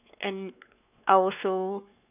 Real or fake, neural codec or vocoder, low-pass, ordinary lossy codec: fake; codec, 16 kHz, 2 kbps, X-Codec, WavLM features, trained on Multilingual LibriSpeech; 3.6 kHz; none